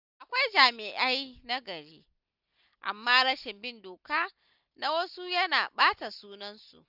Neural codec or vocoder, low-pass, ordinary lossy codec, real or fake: none; 5.4 kHz; none; real